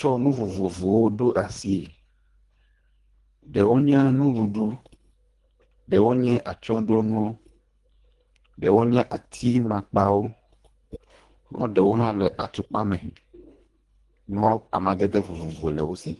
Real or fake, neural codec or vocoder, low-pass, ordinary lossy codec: fake; codec, 24 kHz, 1.5 kbps, HILCodec; 10.8 kHz; Opus, 24 kbps